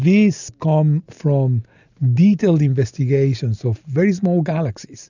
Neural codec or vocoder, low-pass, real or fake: none; 7.2 kHz; real